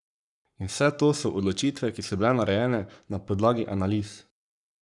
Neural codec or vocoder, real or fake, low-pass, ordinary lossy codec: codec, 44.1 kHz, 7.8 kbps, Pupu-Codec; fake; 10.8 kHz; none